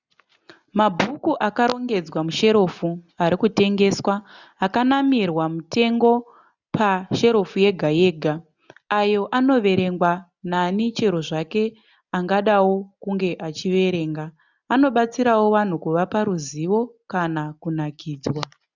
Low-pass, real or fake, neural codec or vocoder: 7.2 kHz; real; none